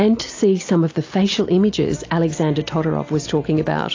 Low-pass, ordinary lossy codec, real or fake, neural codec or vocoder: 7.2 kHz; AAC, 32 kbps; real; none